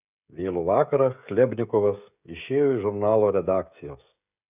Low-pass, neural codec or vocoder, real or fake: 3.6 kHz; codec, 16 kHz, 16 kbps, FreqCodec, smaller model; fake